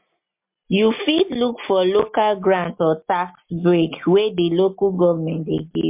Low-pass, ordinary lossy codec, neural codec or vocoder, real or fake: 3.6 kHz; MP3, 32 kbps; none; real